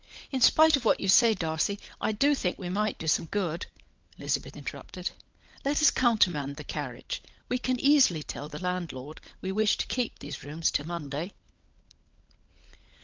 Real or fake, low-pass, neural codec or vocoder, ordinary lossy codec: fake; 7.2 kHz; codec, 16 kHz, 16 kbps, FunCodec, trained on LibriTTS, 50 frames a second; Opus, 32 kbps